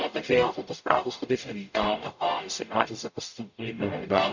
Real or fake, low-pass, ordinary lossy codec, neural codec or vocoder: fake; 7.2 kHz; none; codec, 44.1 kHz, 0.9 kbps, DAC